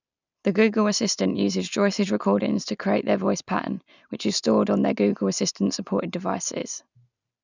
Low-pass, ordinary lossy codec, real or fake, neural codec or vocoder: 7.2 kHz; none; real; none